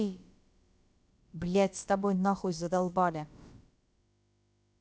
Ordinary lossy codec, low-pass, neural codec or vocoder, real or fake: none; none; codec, 16 kHz, about 1 kbps, DyCAST, with the encoder's durations; fake